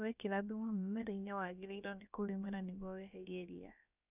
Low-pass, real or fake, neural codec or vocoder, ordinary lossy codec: 3.6 kHz; fake; codec, 16 kHz, about 1 kbps, DyCAST, with the encoder's durations; none